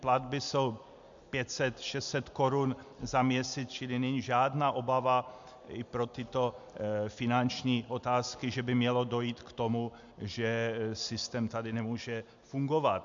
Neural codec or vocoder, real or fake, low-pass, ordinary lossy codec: none; real; 7.2 kHz; MP3, 64 kbps